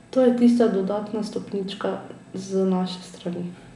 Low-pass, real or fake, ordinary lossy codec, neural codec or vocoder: 10.8 kHz; real; none; none